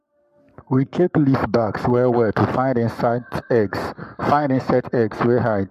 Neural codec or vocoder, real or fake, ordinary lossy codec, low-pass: codec, 44.1 kHz, 7.8 kbps, Pupu-Codec; fake; MP3, 64 kbps; 14.4 kHz